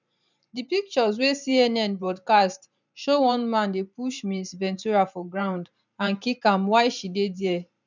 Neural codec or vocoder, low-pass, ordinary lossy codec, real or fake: vocoder, 44.1 kHz, 128 mel bands every 512 samples, BigVGAN v2; 7.2 kHz; none; fake